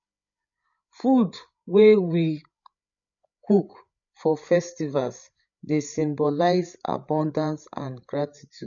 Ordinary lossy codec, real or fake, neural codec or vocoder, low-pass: none; fake; codec, 16 kHz, 8 kbps, FreqCodec, larger model; 7.2 kHz